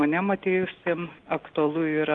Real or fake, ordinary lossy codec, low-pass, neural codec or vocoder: real; Opus, 16 kbps; 9.9 kHz; none